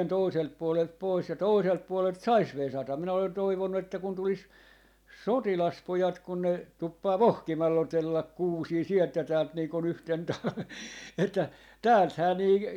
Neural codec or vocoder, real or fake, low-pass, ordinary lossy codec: none; real; 19.8 kHz; none